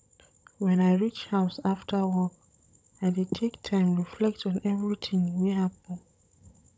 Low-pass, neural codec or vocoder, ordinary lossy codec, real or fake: none; codec, 16 kHz, 16 kbps, FunCodec, trained on Chinese and English, 50 frames a second; none; fake